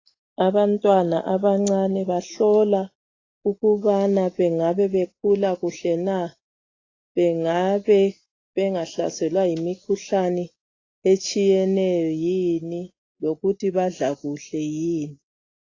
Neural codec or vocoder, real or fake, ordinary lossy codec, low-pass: none; real; AAC, 32 kbps; 7.2 kHz